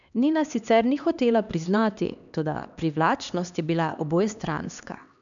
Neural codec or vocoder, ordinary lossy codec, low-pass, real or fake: codec, 16 kHz, 2 kbps, X-Codec, HuBERT features, trained on LibriSpeech; none; 7.2 kHz; fake